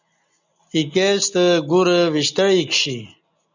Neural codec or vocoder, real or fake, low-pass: none; real; 7.2 kHz